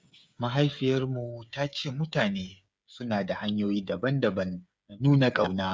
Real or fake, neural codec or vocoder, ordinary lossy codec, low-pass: fake; codec, 16 kHz, 8 kbps, FreqCodec, smaller model; none; none